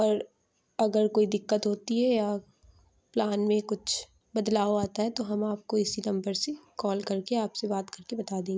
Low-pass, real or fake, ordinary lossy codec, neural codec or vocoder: none; real; none; none